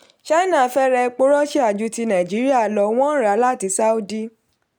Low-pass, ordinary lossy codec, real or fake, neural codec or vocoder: 19.8 kHz; none; real; none